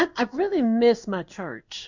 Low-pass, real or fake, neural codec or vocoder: 7.2 kHz; fake; codec, 16 kHz in and 24 kHz out, 1 kbps, XY-Tokenizer